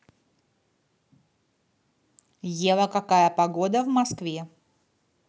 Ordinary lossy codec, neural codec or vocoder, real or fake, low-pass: none; none; real; none